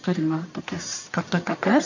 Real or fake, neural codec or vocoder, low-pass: fake; codec, 44.1 kHz, 1.7 kbps, Pupu-Codec; 7.2 kHz